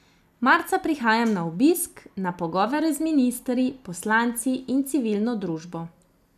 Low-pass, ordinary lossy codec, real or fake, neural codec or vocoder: 14.4 kHz; none; real; none